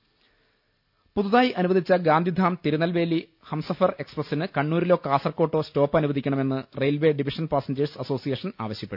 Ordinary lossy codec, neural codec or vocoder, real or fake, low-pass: none; none; real; 5.4 kHz